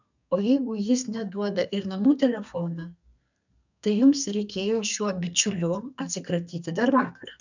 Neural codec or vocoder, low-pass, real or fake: codec, 32 kHz, 1.9 kbps, SNAC; 7.2 kHz; fake